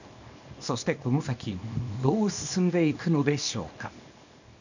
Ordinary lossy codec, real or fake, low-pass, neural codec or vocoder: none; fake; 7.2 kHz; codec, 24 kHz, 0.9 kbps, WavTokenizer, small release